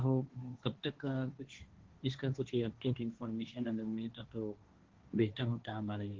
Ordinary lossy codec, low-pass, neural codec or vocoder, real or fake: Opus, 16 kbps; 7.2 kHz; codec, 16 kHz, 1.1 kbps, Voila-Tokenizer; fake